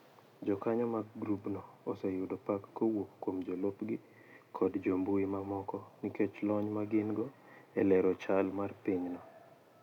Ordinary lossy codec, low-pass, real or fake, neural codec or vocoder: none; 19.8 kHz; real; none